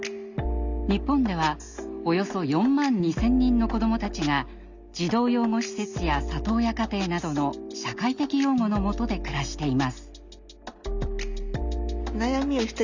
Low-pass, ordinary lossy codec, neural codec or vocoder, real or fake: 7.2 kHz; Opus, 64 kbps; none; real